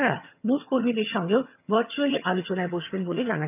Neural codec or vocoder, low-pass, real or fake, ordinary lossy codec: vocoder, 22.05 kHz, 80 mel bands, HiFi-GAN; 3.6 kHz; fake; none